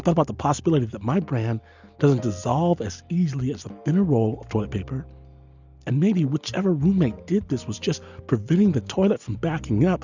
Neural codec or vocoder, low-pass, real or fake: none; 7.2 kHz; real